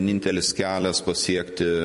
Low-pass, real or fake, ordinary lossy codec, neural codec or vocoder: 14.4 kHz; fake; MP3, 48 kbps; vocoder, 44.1 kHz, 128 mel bands every 256 samples, BigVGAN v2